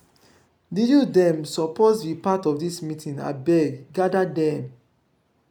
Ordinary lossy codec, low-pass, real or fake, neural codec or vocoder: none; 19.8 kHz; real; none